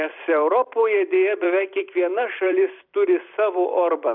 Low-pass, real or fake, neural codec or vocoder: 5.4 kHz; fake; vocoder, 44.1 kHz, 128 mel bands every 256 samples, BigVGAN v2